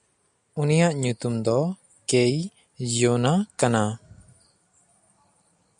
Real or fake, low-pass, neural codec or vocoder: real; 9.9 kHz; none